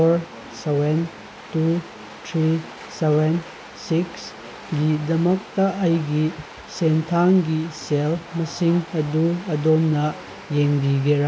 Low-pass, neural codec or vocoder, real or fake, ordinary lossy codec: none; none; real; none